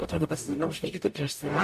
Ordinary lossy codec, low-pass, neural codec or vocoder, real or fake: MP3, 64 kbps; 14.4 kHz; codec, 44.1 kHz, 0.9 kbps, DAC; fake